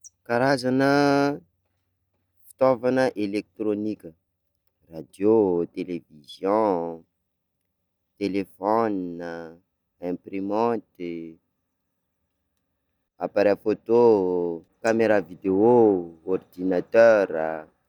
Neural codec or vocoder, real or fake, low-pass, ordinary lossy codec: none; real; 19.8 kHz; none